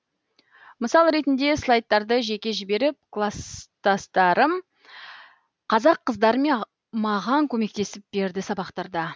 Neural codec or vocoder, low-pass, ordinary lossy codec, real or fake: none; none; none; real